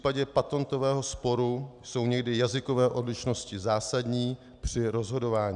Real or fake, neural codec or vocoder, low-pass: real; none; 10.8 kHz